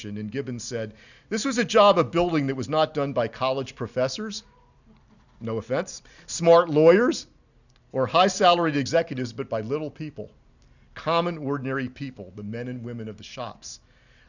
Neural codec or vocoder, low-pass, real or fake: none; 7.2 kHz; real